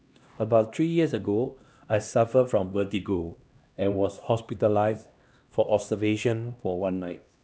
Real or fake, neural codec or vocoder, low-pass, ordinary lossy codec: fake; codec, 16 kHz, 1 kbps, X-Codec, HuBERT features, trained on LibriSpeech; none; none